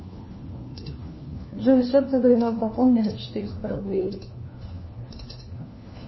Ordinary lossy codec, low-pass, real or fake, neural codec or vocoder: MP3, 24 kbps; 7.2 kHz; fake; codec, 16 kHz, 1 kbps, FunCodec, trained on LibriTTS, 50 frames a second